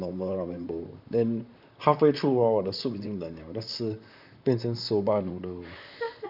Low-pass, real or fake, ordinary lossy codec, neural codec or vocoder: 5.4 kHz; fake; none; vocoder, 44.1 kHz, 128 mel bands, Pupu-Vocoder